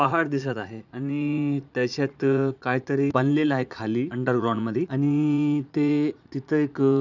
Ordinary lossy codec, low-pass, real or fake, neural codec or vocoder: none; 7.2 kHz; fake; vocoder, 44.1 kHz, 80 mel bands, Vocos